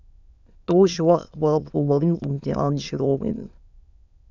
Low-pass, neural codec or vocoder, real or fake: 7.2 kHz; autoencoder, 22.05 kHz, a latent of 192 numbers a frame, VITS, trained on many speakers; fake